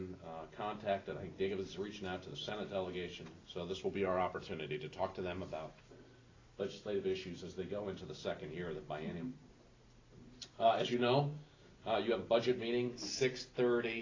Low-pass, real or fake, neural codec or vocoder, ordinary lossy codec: 7.2 kHz; real; none; AAC, 32 kbps